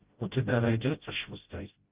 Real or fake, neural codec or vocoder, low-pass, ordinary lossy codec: fake; codec, 16 kHz, 0.5 kbps, FreqCodec, smaller model; 3.6 kHz; Opus, 32 kbps